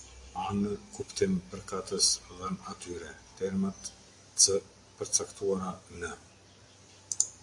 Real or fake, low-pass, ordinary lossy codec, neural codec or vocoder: real; 10.8 kHz; AAC, 64 kbps; none